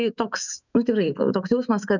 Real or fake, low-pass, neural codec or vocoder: fake; 7.2 kHz; autoencoder, 48 kHz, 128 numbers a frame, DAC-VAE, trained on Japanese speech